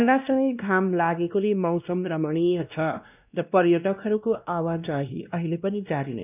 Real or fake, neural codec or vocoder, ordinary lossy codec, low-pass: fake; codec, 16 kHz, 1 kbps, X-Codec, WavLM features, trained on Multilingual LibriSpeech; none; 3.6 kHz